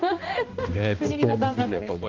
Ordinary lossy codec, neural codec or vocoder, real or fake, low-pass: Opus, 32 kbps; codec, 16 kHz, 1 kbps, X-Codec, HuBERT features, trained on balanced general audio; fake; 7.2 kHz